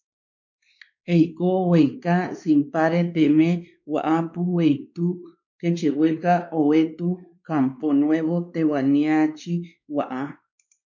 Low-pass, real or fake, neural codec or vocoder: 7.2 kHz; fake; codec, 16 kHz, 2 kbps, X-Codec, WavLM features, trained on Multilingual LibriSpeech